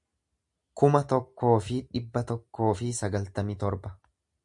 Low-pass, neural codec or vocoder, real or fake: 10.8 kHz; none; real